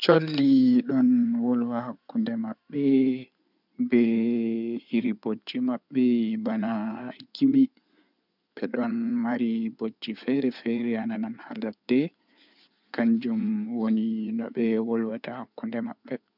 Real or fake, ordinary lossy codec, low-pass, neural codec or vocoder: fake; none; 5.4 kHz; codec, 16 kHz in and 24 kHz out, 2.2 kbps, FireRedTTS-2 codec